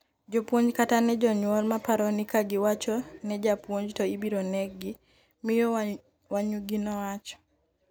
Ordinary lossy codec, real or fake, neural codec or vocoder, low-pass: none; real; none; none